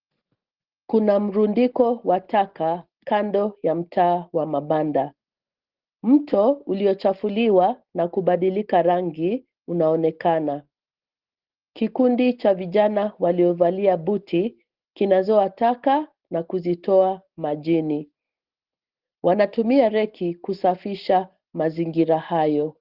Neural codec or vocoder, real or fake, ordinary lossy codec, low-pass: none; real; Opus, 16 kbps; 5.4 kHz